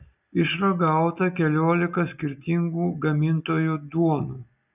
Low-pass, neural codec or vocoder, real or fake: 3.6 kHz; none; real